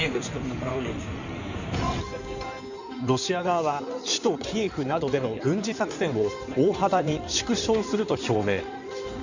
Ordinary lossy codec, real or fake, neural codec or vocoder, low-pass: none; fake; codec, 16 kHz in and 24 kHz out, 2.2 kbps, FireRedTTS-2 codec; 7.2 kHz